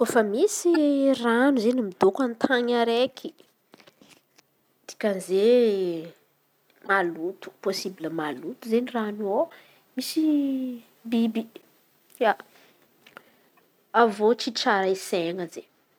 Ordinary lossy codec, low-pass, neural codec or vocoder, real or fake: none; 19.8 kHz; none; real